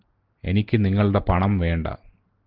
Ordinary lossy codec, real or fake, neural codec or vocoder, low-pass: Opus, 16 kbps; real; none; 5.4 kHz